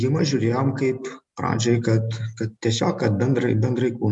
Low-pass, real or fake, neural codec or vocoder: 10.8 kHz; real; none